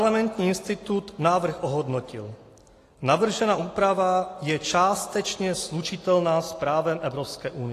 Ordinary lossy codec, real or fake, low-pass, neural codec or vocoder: AAC, 48 kbps; real; 14.4 kHz; none